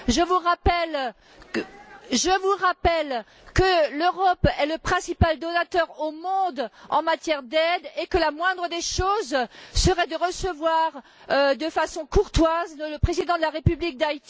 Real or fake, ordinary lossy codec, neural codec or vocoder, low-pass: real; none; none; none